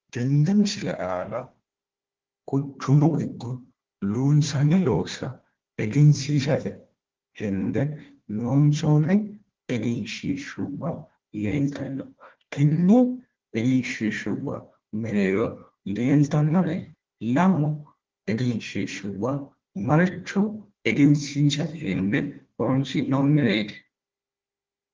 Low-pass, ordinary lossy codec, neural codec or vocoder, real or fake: 7.2 kHz; Opus, 16 kbps; codec, 16 kHz, 1 kbps, FunCodec, trained on Chinese and English, 50 frames a second; fake